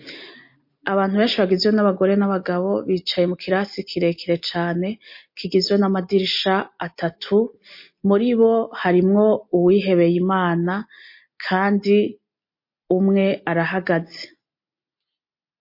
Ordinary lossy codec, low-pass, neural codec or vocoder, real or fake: MP3, 32 kbps; 5.4 kHz; none; real